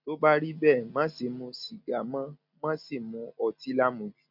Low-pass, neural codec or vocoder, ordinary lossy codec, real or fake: 5.4 kHz; none; none; real